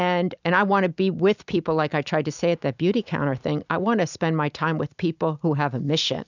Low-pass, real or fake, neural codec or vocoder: 7.2 kHz; real; none